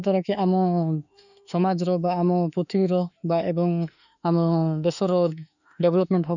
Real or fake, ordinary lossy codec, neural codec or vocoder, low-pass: fake; none; autoencoder, 48 kHz, 32 numbers a frame, DAC-VAE, trained on Japanese speech; 7.2 kHz